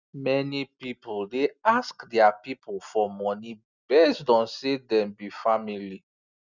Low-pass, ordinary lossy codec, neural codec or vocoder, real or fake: 7.2 kHz; none; none; real